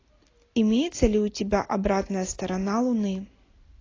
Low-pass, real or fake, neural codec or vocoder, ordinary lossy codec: 7.2 kHz; real; none; AAC, 32 kbps